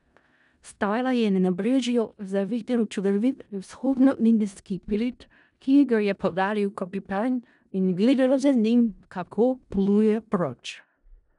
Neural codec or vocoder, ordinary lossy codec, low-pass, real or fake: codec, 16 kHz in and 24 kHz out, 0.4 kbps, LongCat-Audio-Codec, four codebook decoder; none; 10.8 kHz; fake